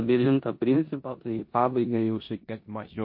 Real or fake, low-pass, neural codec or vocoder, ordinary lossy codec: fake; 5.4 kHz; codec, 16 kHz in and 24 kHz out, 0.9 kbps, LongCat-Audio-Codec, four codebook decoder; MP3, 32 kbps